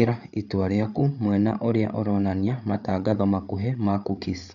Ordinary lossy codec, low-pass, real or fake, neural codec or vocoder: none; 7.2 kHz; fake; codec, 16 kHz, 8 kbps, FreqCodec, larger model